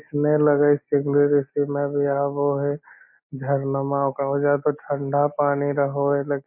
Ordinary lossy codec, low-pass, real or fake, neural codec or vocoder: MP3, 24 kbps; 3.6 kHz; real; none